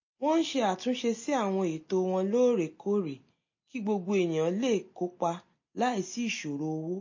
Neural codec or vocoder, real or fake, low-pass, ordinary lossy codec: none; real; 7.2 kHz; MP3, 32 kbps